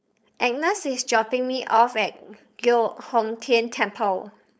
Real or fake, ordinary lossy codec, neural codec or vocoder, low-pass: fake; none; codec, 16 kHz, 4.8 kbps, FACodec; none